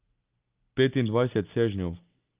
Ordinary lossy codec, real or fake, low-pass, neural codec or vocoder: Opus, 24 kbps; real; 3.6 kHz; none